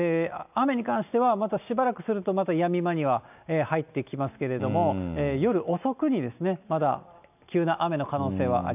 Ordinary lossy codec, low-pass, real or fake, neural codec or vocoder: none; 3.6 kHz; real; none